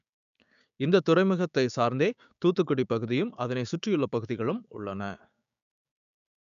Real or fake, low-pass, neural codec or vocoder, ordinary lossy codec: fake; 7.2 kHz; codec, 16 kHz, 6 kbps, DAC; none